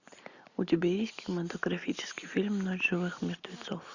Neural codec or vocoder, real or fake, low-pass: none; real; 7.2 kHz